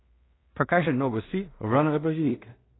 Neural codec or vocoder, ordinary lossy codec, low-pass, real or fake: codec, 16 kHz in and 24 kHz out, 0.4 kbps, LongCat-Audio-Codec, two codebook decoder; AAC, 16 kbps; 7.2 kHz; fake